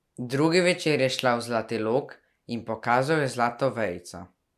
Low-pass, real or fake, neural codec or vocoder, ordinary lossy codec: 14.4 kHz; real; none; none